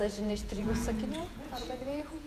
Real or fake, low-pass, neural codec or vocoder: fake; 14.4 kHz; vocoder, 48 kHz, 128 mel bands, Vocos